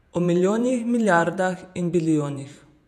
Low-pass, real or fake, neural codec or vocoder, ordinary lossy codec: 14.4 kHz; fake; vocoder, 48 kHz, 128 mel bands, Vocos; none